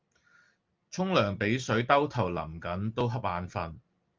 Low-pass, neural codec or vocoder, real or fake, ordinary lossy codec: 7.2 kHz; none; real; Opus, 24 kbps